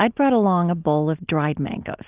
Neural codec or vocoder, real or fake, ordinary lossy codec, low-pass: none; real; Opus, 32 kbps; 3.6 kHz